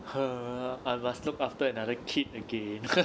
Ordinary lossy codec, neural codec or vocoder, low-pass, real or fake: none; none; none; real